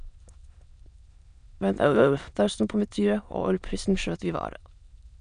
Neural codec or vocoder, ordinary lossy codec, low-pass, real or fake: autoencoder, 22.05 kHz, a latent of 192 numbers a frame, VITS, trained on many speakers; none; 9.9 kHz; fake